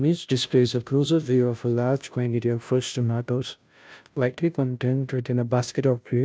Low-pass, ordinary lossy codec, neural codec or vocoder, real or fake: none; none; codec, 16 kHz, 0.5 kbps, FunCodec, trained on Chinese and English, 25 frames a second; fake